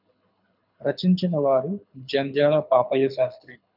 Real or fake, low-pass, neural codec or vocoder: fake; 5.4 kHz; codec, 24 kHz, 6 kbps, HILCodec